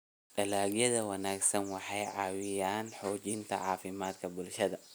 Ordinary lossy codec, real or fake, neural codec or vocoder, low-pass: none; real; none; none